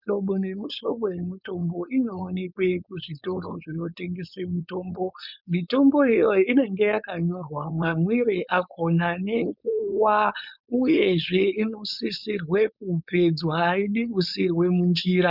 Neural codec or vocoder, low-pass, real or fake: codec, 16 kHz, 4.8 kbps, FACodec; 5.4 kHz; fake